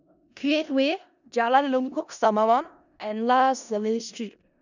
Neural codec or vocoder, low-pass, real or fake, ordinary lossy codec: codec, 16 kHz in and 24 kHz out, 0.4 kbps, LongCat-Audio-Codec, four codebook decoder; 7.2 kHz; fake; none